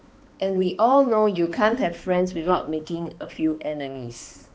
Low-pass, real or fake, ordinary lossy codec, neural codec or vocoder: none; fake; none; codec, 16 kHz, 2 kbps, X-Codec, HuBERT features, trained on balanced general audio